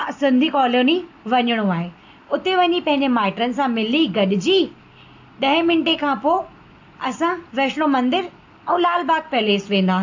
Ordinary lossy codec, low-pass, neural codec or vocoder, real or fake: AAC, 48 kbps; 7.2 kHz; none; real